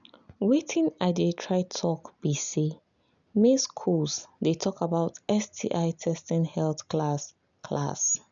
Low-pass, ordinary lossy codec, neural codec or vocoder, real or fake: 7.2 kHz; none; none; real